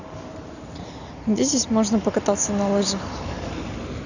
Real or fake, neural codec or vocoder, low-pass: real; none; 7.2 kHz